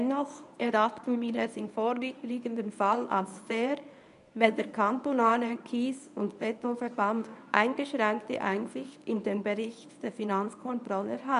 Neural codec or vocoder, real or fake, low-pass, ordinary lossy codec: codec, 24 kHz, 0.9 kbps, WavTokenizer, medium speech release version 1; fake; 10.8 kHz; none